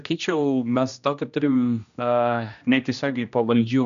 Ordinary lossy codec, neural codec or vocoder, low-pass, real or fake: MP3, 96 kbps; codec, 16 kHz, 1 kbps, X-Codec, HuBERT features, trained on general audio; 7.2 kHz; fake